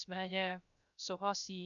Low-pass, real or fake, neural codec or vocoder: 7.2 kHz; fake; codec, 16 kHz, 0.3 kbps, FocalCodec